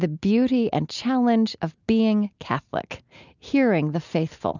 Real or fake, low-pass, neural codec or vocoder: real; 7.2 kHz; none